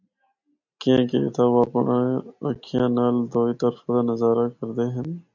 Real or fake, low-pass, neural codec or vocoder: real; 7.2 kHz; none